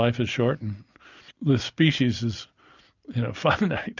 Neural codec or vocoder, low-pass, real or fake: none; 7.2 kHz; real